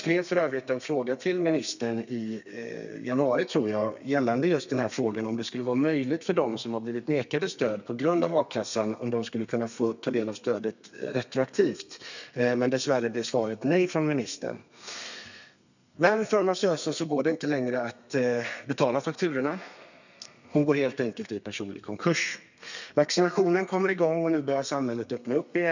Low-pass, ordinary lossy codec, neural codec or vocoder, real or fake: 7.2 kHz; none; codec, 32 kHz, 1.9 kbps, SNAC; fake